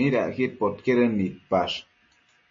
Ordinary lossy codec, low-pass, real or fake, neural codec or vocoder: MP3, 32 kbps; 7.2 kHz; real; none